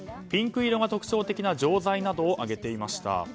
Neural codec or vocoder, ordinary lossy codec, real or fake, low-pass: none; none; real; none